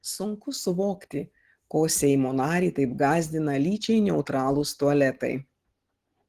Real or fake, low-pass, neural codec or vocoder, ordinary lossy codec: real; 14.4 kHz; none; Opus, 16 kbps